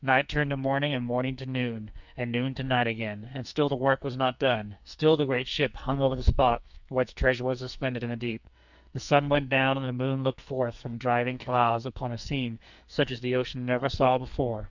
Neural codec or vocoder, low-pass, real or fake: codec, 44.1 kHz, 2.6 kbps, SNAC; 7.2 kHz; fake